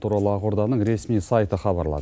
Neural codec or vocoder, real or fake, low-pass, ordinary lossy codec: none; real; none; none